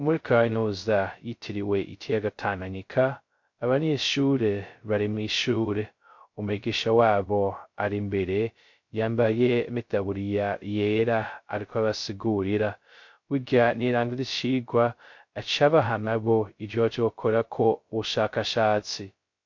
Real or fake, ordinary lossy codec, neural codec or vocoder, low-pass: fake; MP3, 48 kbps; codec, 16 kHz, 0.2 kbps, FocalCodec; 7.2 kHz